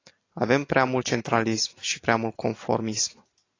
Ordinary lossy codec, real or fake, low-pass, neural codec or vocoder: AAC, 32 kbps; real; 7.2 kHz; none